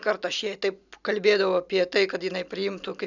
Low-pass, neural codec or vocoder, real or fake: 7.2 kHz; none; real